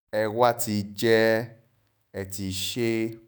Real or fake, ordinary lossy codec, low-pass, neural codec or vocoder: fake; none; none; autoencoder, 48 kHz, 128 numbers a frame, DAC-VAE, trained on Japanese speech